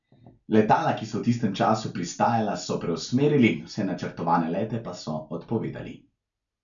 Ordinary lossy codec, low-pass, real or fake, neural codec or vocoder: none; 7.2 kHz; real; none